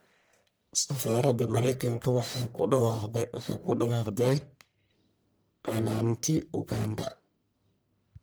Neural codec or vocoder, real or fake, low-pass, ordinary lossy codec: codec, 44.1 kHz, 1.7 kbps, Pupu-Codec; fake; none; none